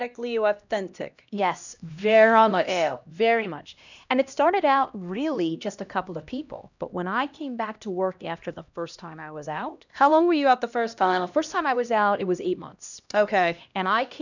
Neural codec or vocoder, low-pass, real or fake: codec, 16 kHz, 1 kbps, X-Codec, HuBERT features, trained on LibriSpeech; 7.2 kHz; fake